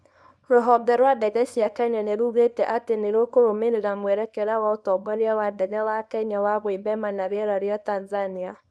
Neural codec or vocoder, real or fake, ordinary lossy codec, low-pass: codec, 24 kHz, 0.9 kbps, WavTokenizer, small release; fake; none; none